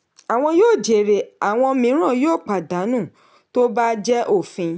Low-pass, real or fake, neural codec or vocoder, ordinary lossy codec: none; real; none; none